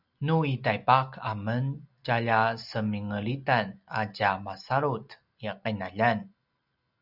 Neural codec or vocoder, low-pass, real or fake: none; 5.4 kHz; real